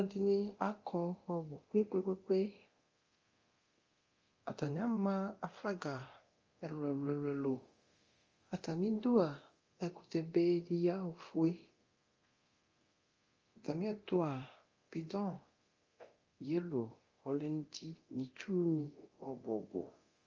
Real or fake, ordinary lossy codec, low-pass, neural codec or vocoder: fake; Opus, 32 kbps; 7.2 kHz; codec, 24 kHz, 0.9 kbps, DualCodec